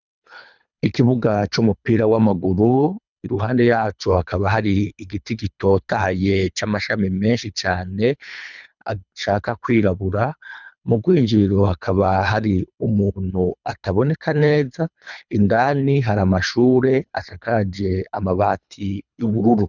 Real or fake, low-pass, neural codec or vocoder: fake; 7.2 kHz; codec, 24 kHz, 3 kbps, HILCodec